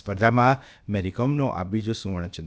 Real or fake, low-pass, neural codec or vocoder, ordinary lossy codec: fake; none; codec, 16 kHz, about 1 kbps, DyCAST, with the encoder's durations; none